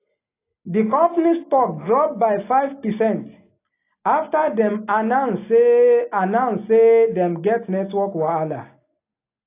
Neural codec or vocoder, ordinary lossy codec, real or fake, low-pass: none; AAC, 24 kbps; real; 3.6 kHz